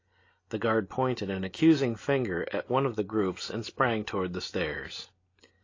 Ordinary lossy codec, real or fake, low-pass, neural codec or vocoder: AAC, 32 kbps; real; 7.2 kHz; none